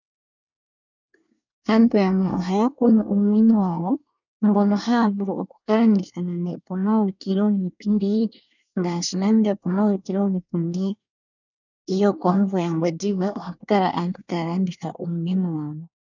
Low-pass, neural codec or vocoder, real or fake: 7.2 kHz; codec, 24 kHz, 1 kbps, SNAC; fake